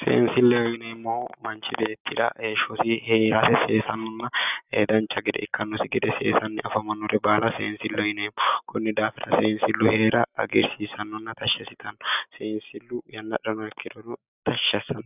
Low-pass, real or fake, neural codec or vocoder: 3.6 kHz; real; none